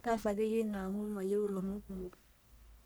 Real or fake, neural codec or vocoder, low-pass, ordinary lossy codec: fake; codec, 44.1 kHz, 1.7 kbps, Pupu-Codec; none; none